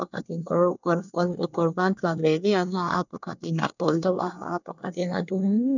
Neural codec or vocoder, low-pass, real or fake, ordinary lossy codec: codec, 24 kHz, 1 kbps, SNAC; 7.2 kHz; fake; none